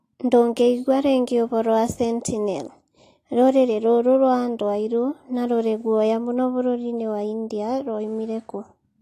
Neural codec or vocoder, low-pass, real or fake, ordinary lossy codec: none; 14.4 kHz; real; AAC, 48 kbps